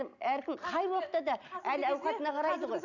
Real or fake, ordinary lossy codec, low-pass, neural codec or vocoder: real; none; 7.2 kHz; none